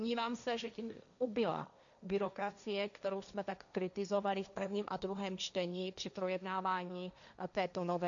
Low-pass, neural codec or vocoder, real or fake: 7.2 kHz; codec, 16 kHz, 1.1 kbps, Voila-Tokenizer; fake